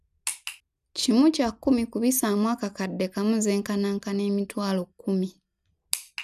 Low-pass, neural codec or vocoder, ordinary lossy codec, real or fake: 14.4 kHz; none; none; real